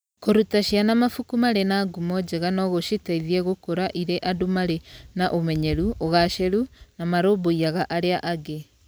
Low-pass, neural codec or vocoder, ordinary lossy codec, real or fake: none; none; none; real